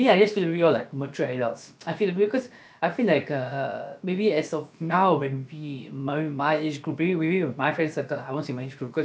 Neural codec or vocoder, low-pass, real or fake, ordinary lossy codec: codec, 16 kHz, about 1 kbps, DyCAST, with the encoder's durations; none; fake; none